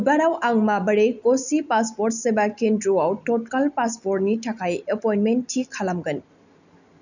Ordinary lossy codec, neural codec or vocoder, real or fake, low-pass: none; none; real; 7.2 kHz